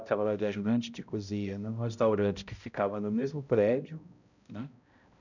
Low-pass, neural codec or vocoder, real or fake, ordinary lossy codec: 7.2 kHz; codec, 16 kHz, 0.5 kbps, X-Codec, HuBERT features, trained on balanced general audio; fake; none